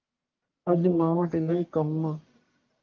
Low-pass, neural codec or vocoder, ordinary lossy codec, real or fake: 7.2 kHz; codec, 44.1 kHz, 1.7 kbps, Pupu-Codec; Opus, 24 kbps; fake